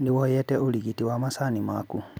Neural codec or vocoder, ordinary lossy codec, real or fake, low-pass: none; none; real; none